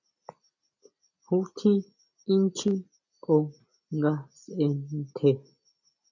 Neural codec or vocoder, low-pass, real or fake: none; 7.2 kHz; real